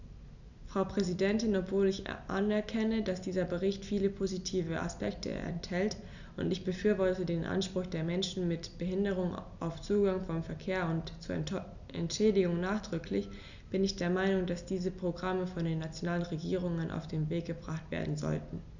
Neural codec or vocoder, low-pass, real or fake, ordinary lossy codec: none; 7.2 kHz; real; none